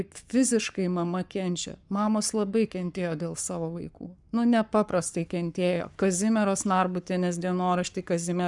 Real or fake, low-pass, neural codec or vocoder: fake; 10.8 kHz; codec, 44.1 kHz, 7.8 kbps, Pupu-Codec